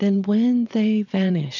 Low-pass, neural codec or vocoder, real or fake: 7.2 kHz; vocoder, 22.05 kHz, 80 mel bands, WaveNeXt; fake